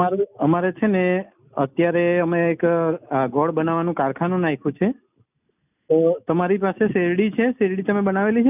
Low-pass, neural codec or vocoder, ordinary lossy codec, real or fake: 3.6 kHz; none; none; real